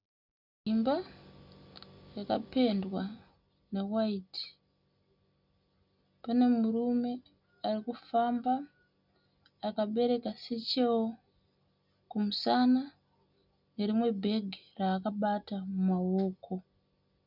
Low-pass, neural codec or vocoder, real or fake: 5.4 kHz; none; real